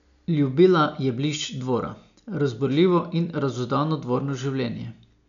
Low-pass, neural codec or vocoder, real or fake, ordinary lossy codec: 7.2 kHz; none; real; none